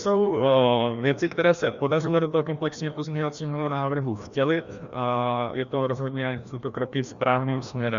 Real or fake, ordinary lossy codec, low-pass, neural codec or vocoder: fake; Opus, 64 kbps; 7.2 kHz; codec, 16 kHz, 1 kbps, FreqCodec, larger model